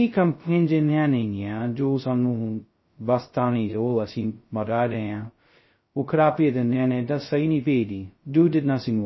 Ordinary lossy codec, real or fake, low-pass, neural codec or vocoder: MP3, 24 kbps; fake; 7.2 kHz; codec, 16 kHz, 0.2 kbps, FocalCodec